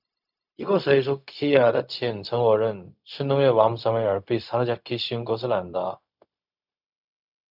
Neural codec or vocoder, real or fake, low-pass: codec, 16 kHz, 0.4 kbps, LongCat-Audio-Codec; fake; 5.4 kHz